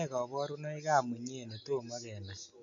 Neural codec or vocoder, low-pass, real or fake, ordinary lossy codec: none; 7.2 kHz; real; MP3, 96 kbps